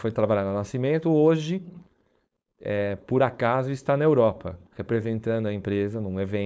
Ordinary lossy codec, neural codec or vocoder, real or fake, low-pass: none; codec, 16 kHz, 4.8 kbps, FACodec; fake; none